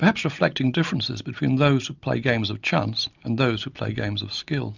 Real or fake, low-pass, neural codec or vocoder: real; 7.2 kHz; none